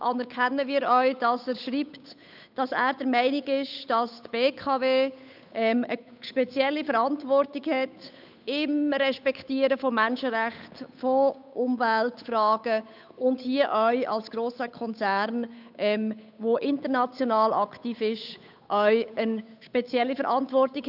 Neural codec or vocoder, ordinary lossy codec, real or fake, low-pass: codec, 16 kHz, 8 kbps, FunCodec, trained on Chinese and English, 25 frames a second; none; fake; 5.4 kHz